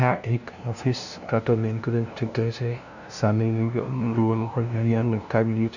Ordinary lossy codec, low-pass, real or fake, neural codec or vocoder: none; 7.2 kHz; fake; codec, 16 kHz, 0.5 kbps, FunCodec, trained on LibriTTS, 25 frames a second